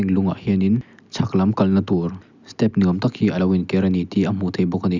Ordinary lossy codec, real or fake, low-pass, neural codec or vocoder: none; real; 7.2 kHz; none